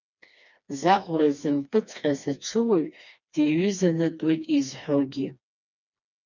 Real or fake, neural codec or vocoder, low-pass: fake; codec, 16 kHz, 2 kbps, FreqCodec, smaller model; 7.2 kHz